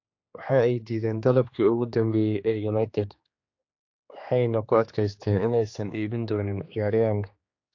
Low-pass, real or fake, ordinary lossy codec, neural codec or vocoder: 7.2 kHz; fake; AAC, 48 kbps; codec, 16 kHz, 2 kbps, X-Codec, HuBERT features, trained on general audio